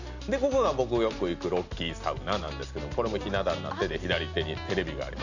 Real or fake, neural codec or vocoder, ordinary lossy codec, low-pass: real; none; none; 7.2 kHz